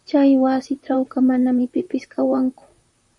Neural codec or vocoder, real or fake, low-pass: vocoder, 44.1 kHz, 128 mel bands, Pupu-Vocoder; fake; 10.8 kHz